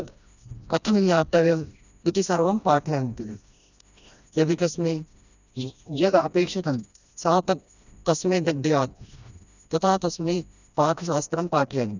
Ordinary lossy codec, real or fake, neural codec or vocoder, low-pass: none; fake; codec, 16 kHz, 1 kbps, FreqCodec, smaller model; 7.2 kHz